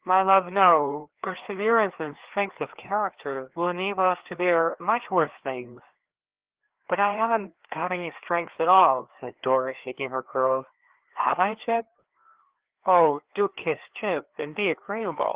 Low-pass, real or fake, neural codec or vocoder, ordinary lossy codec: 3.6 kHz; fake; codec, 16 kHz, 2 kbps, FreqCodec, larger model; Opus, 16 kbps